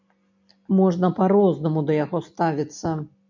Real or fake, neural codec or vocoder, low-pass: real; none; 7.2 kHz